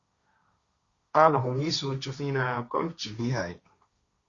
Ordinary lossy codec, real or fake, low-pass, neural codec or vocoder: Opus, 64 kbps; fake; 7.2 kHz; codec, 16 kHz, 1.1 kbps, Voila-Tokenizer